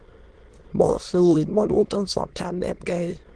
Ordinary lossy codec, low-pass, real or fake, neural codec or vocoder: Opus, 16 kbps; 9.9 kHz; fake; autoencoder, 22.05 kHz, a latent of 192 numbers a frame, VITS, trained on many speakers